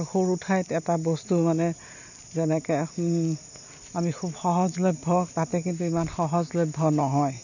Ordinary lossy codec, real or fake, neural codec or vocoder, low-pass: none; real; none; 7.2 kHz